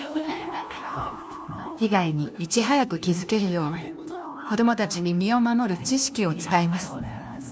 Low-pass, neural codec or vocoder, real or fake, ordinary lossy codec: none; codec, 16 kHz, 1 kbps, FunCodec, trained on LibriTTS, 50 frames a second; fake; none